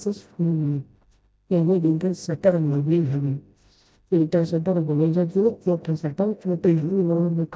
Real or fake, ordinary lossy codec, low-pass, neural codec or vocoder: fake; none; none; codec, 16 kHz, 0.5 kbps, FreqCodec, smaller model